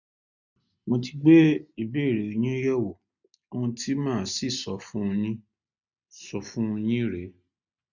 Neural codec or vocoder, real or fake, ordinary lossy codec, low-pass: none; real; none; 7.2 kHz